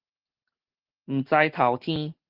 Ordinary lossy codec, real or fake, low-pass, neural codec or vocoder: Opus, 16 kbps; real; 5.4 kHz; none